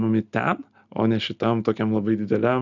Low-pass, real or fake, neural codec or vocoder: 7.2 kHz; real; none